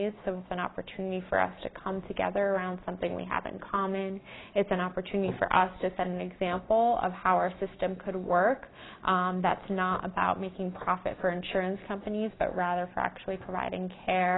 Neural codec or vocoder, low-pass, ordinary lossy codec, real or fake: none; 7.2 kHz; AAC, 16 kbps; real